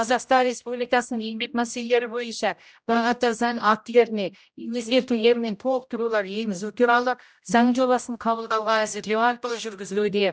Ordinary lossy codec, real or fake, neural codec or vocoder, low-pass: none; fake; codec, 16 kHz, 0.5 kbps, X-Codec, HuBERT features, trained on general audio; none